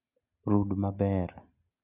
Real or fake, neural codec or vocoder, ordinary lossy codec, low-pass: real; none; none; 3.6 kHz